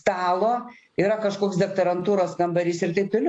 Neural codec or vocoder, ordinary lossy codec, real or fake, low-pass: none; AAC, 48 kbps; real; 9.9 kHz